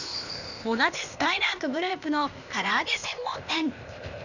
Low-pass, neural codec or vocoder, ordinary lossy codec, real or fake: 7.2 kHz; codec, 16 kHz, 0.8 kbps, ZipCodec; none; fake